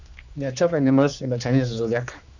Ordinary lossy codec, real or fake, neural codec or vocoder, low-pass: none; fake; codec, 16 kHz, 2 kbps, X-Codec, HuBERT features, trained on general audio; 7.2 kHz